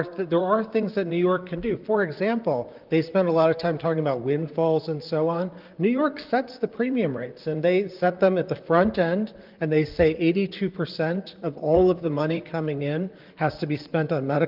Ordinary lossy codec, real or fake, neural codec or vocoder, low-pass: Opus, 32 kbps; fake; vocoder, 44.1 kHz, 128 mel bands, Pupu-Vocoder; 5.4 kHz